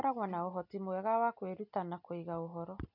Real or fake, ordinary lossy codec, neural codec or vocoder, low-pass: real; none; none; 5.4 kHz